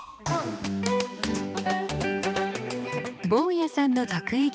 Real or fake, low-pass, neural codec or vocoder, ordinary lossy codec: fake; none; codec, 16 kHz, 2 kbps, X-Codec, HuBERT features, trained on balanced general audio; none